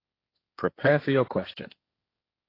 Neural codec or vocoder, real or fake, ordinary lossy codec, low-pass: codec, 16 kHz, 1.1 kbps, Voila-Tokenizer; fake; AAC, 24 kbps; 5.4 kHz